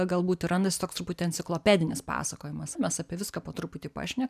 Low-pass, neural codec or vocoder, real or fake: 14.4 kHz; vocoder, 44.1 kHz, 128 mel bands every 512 samples, BigVGAN v2; fake